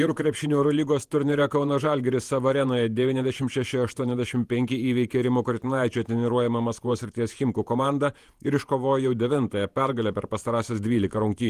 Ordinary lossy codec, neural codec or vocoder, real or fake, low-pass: Opus, 24 kbps; vocoder, 48 kHz, 128 mel bands, Vocos; fake; 14.4 kHz